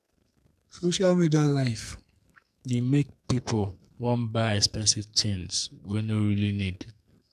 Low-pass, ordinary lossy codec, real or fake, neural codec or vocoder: 14.4 kHz; none; fake; codec, 44.1 kHz, 2.6 kbps, SNAC